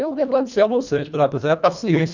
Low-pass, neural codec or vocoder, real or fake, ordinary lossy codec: 7.2 kHz; codec, 24 kHz, 1.5 kbps, HILCodec; fake; none